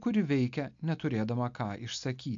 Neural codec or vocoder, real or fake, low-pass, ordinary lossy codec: none; real; 7.2 kHz; AAC, 64 kbps